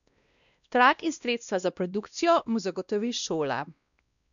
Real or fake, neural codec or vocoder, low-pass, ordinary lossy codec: fake; codec, 16 kHz, 1 kbps, X-Codec, WavLM features, trained on Multilingual LibriSpeech; 7.2 kHz; none